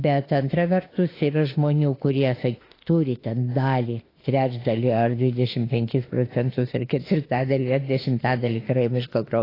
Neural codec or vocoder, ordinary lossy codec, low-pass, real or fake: autoencoder, 48 kHz, 32 numbers a frame, DAC-VAE, trained on Japanese speech; AAC, 24 kbps; 5.4 kHz; fake